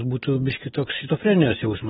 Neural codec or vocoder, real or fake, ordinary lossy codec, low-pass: none; real; AAC, 16 kbps; 7.2 kHz